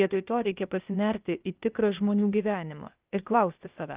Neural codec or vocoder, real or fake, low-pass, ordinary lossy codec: codec, 16 kHz, 0.8 kbps, ZipCodec; fake; 3.6 kHz; Opus, 32 kbps